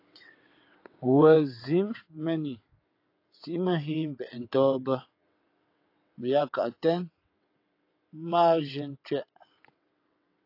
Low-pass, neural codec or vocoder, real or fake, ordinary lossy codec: 5.4 kHz; vocoder, 22.05 kHz, 80 mel bands, WaveNeXt; fake; AAC, 32 kbps